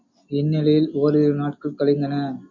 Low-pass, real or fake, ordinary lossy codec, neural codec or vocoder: 7.2 kHz; real; MP3, 48 kbps; none